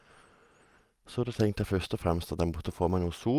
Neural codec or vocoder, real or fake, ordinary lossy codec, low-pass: none; real; Opus, 32 kbps; 14.4 kHz